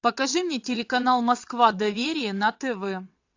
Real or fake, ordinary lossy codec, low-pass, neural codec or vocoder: fake; AAC, 48 kbps; 7.2 kHz; vocoder, 22.05 kHz, 80 mel bands, WaveNeXt